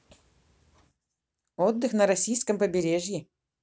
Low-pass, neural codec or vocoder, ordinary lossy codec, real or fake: none; none; none; real